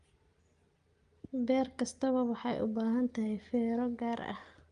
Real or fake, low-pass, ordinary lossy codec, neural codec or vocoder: real; 9.9 kHz; Opus, 32 kbps; none